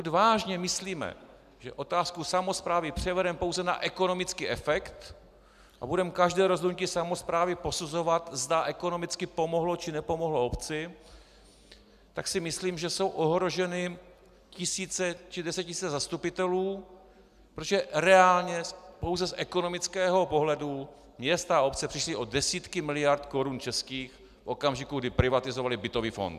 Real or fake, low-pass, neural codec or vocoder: real; 14.4 kHz; none